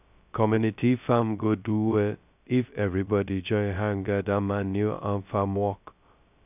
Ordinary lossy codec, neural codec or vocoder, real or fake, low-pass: none; codec, 16 kHz, 0.2 kbps, FocalCodec; fake; 3.6 kHz